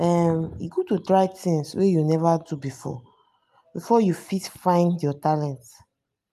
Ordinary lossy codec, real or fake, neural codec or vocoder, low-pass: none; real; none; 14.4 kHz